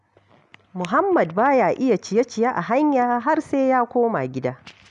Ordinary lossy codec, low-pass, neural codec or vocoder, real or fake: none; 10.8 kHz; none; real